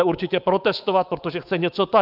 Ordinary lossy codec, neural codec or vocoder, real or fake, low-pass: Opus, 32 kbps; codec, 24 kHz, 3.1 kbps, DualCodec; fake; 5.4 kHz